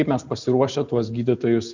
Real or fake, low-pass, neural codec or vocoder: real; 7.2 kHz; none